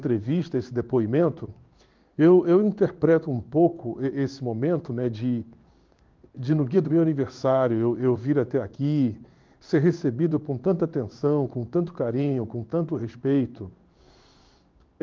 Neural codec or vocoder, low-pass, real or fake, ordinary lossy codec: codec, 16 kHz in and 24 kHz out, 1 kbps, XY-Tokenizer; 7.2 kHz; fake; Opus, 24 kbps